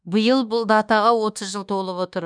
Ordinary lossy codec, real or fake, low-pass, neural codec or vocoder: none; fake; 9.9 kHz; codec, 24 kHz, 1.2 kbps, DualCodec